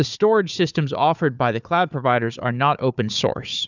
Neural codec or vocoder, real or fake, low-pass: codec, 16 kHz, 4 kbps, FunCodec, trained on Chinese and English, 50 frames a second; fake; 7.2 kHz